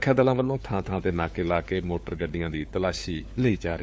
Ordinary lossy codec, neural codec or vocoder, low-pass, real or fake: none; codec, 16 kHz, 4 kbps, FunCodec, trained on LibriTTS, 50 frames a second; none; fake